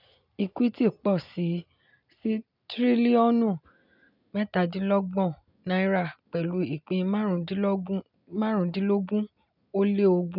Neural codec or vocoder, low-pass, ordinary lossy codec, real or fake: none; 5.4 kHz; none; real